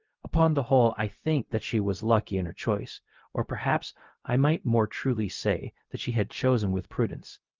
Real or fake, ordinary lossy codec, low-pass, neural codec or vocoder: fake; Opus, 32 kbps; 7.2 kHz; codec, 16 kHz, 0.4 kbps, LongCat-Audio-Codec